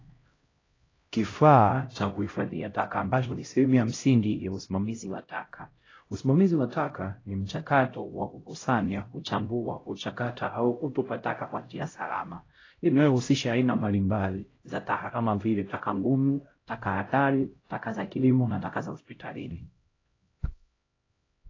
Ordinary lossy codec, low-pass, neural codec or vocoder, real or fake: AAC, 32 kbps; 7.2 kHz; codec, 16 kHz, 0.5 kbps, X-Codec, HuBERT features, trained on LibriSpeech; fake